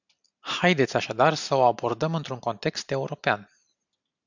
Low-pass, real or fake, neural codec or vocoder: 7.2 kHz; real; none